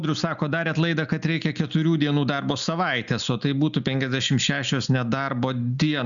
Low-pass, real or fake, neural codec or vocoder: 7.2 kHz; real; none